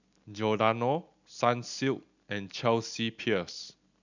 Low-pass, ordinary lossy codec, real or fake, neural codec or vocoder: 7.2 kHz; none; real; none